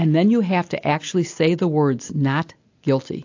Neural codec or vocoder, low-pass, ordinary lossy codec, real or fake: none; 7.2 kHz; AAC, 48 kbps; real